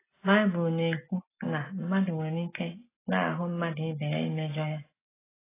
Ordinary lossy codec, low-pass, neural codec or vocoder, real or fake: AAC, 16 kbps; 3.6 kHz; none; real